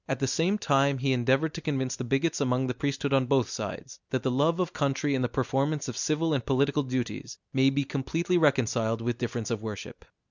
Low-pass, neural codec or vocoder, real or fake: 7.2 kHz; none; real